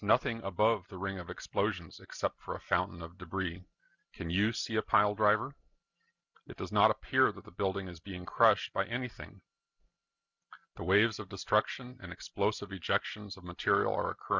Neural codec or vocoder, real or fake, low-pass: none; real; 7.2 kHz